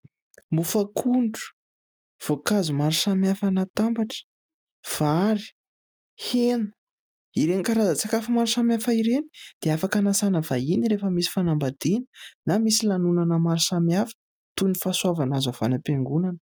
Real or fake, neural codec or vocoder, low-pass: real; none; 19.8 kHz